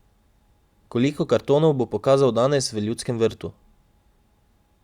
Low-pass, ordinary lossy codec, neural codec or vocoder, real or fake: 19.8 kHz; Opus, 64 kbps; none; real